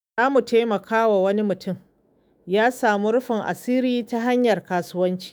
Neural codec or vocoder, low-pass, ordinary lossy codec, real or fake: autoencoder, 48 kHz, 128 numbers a frame, DAC-VAE, trained on Japanese speech; none; none; fake